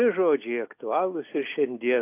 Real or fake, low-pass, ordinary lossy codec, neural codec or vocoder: real; 3.6 kHz; AAC, 24 kbps; none